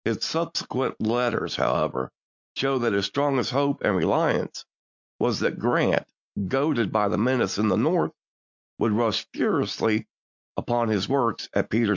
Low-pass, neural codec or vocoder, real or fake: 7.2 kHz; none; real